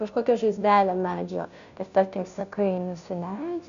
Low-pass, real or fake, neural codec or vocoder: 7.2 kHz; fake; codec, 16 kHz, 0.5 kbps, FunCodec, trained on Chinese and English, 25 frames a second